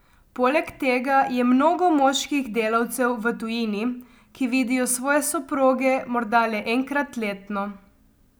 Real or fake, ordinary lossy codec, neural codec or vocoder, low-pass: real; none; none; none